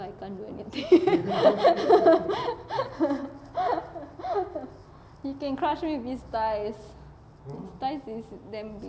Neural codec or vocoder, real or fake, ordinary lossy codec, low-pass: none; real; none; none